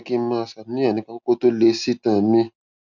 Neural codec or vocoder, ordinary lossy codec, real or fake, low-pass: none; none; real; 7.2 kHz